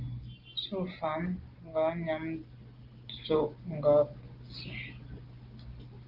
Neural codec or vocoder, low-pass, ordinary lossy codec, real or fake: none; 5.4 kHz; Opus, 16 kbps; real